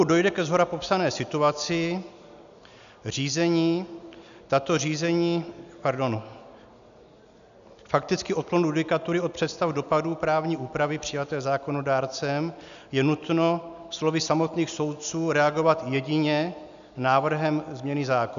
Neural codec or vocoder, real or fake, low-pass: none; real; 7.2 kHz